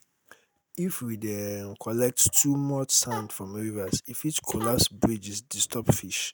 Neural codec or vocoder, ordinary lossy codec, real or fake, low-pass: none; none; real; none